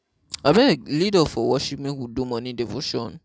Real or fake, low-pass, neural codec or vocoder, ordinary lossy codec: real; none; none; none